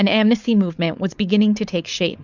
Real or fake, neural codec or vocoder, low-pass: fake; codec, 16 kHz, 4.8 kbps, FACodec; 7.2 kHz